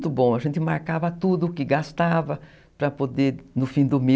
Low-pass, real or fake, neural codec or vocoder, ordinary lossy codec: none; real; none; none